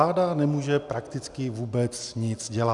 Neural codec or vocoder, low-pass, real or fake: none; 10.8 kHz; real